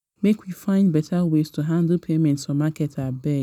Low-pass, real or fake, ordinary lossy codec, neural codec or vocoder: 19.8 kHz; real; none; none